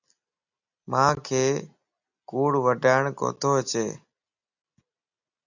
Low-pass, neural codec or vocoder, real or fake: 7.2 kHz; none; real